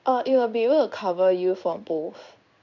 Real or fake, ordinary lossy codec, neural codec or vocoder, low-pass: fake; none; codec, 16 kHz in and 24 kHz out, 1 kbps, XY-Tokenizer; 7.2 kHz